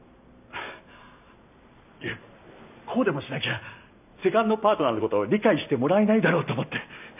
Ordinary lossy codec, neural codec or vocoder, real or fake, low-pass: none; none; real; 3.6 kHz